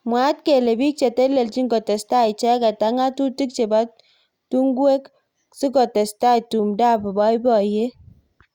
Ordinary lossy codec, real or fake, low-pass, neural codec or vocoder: Opus, 64 kbps; real; 19.8 kHz; none